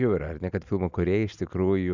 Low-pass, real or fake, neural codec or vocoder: 7.2 kHz; real; none